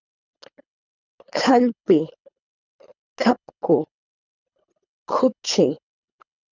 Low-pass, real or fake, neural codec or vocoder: 7.2 kHz; fake; codec, 24 kHz, 3 kbps, HILCodec